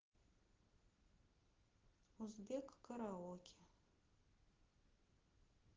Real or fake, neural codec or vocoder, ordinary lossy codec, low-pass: real; none; Opus, 16 kbps; 7.2 kHz